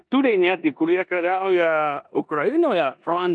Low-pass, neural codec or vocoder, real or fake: 9.9 kHz; codec, 16 kHz in and 24 kHz out, 0.9 kbps, LongCat-Audio-Codec, four codebook decoder; fake